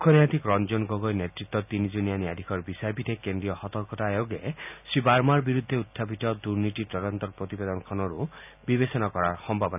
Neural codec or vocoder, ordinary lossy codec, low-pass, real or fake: none; none; 3.6 kHz; real